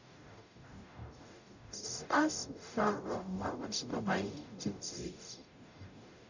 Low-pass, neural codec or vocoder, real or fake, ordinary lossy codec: 7.2 kHz; codec, 44.1 kHz, 0.9 kbps, DAC; fake; none